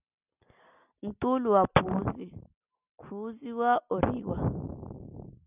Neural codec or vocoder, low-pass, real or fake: none; 3.6 kHz; real